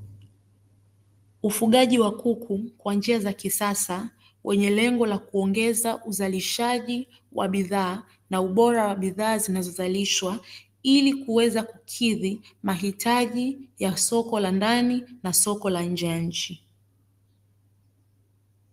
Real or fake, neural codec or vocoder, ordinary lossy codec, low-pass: real; none; Opus, 24 kbps; 14.4 kHz